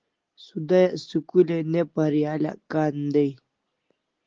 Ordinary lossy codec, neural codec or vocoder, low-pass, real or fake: Opus, 16 kbps; none; 7.2 kHz; real